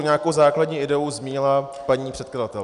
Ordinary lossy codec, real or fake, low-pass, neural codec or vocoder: MP3, 96 kbps; real; 10.8 kHz; none